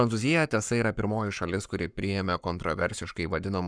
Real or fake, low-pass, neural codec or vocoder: fake; 9.9 kHz; codec, 44.1 kHz, 7.8 kbps, Pupu-Codec